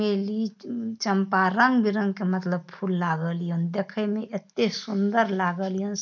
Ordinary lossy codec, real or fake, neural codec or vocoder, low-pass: none; real; none; 7.2 kHz